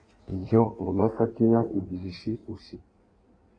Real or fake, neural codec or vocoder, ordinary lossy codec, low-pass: fake; codec, 16 kHz in and 24 kHz out, 1.1 kbps, FireRedTTS-2 codec; AAC, 32 kbps; 9.9 kHz